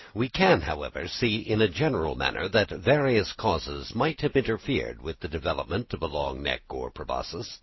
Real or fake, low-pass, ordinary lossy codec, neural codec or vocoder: real; 7.2 kHz; MP3, 24 kbps; none